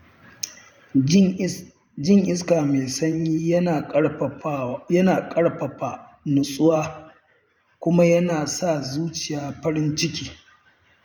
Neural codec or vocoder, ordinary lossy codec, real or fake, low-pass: vocoder, 44.1 kHz, 128 mel bands every 512 samples, BigVGAN v2; none; fake; 19.8 kHz